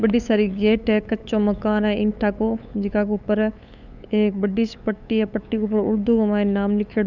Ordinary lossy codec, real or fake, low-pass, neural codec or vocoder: none; fake; 7.2 kHz; codec, 16 kHz, 8 kbps, FunCodec, trained on LibriTTS, 25 frames a second